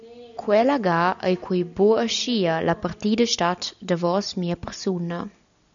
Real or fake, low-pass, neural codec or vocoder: real; 7.2 kHz; none